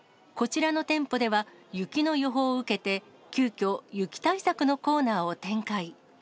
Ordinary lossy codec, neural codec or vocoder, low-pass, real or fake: none; none; none; real